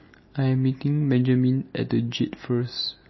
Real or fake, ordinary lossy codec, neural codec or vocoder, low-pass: real; MP3, 24 kbps; none; 7.2 kHz